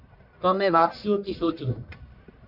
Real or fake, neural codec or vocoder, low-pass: fake; codec, 44.1 kHz, 1.7 kbps, Pupu-Codec; 5.4 kHz